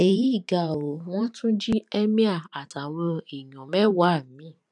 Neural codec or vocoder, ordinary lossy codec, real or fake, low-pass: vocoder, 44.1 kHz, 128 mel bands every 512 samples, BigVGAN v2; none; fake; 10.8 kHz